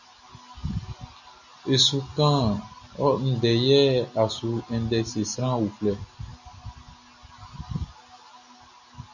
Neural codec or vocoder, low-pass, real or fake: none; 7.2 kHz; real